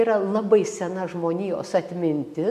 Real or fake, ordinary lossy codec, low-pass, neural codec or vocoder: real; MP3, 64 kbps; 14.4 kHz; none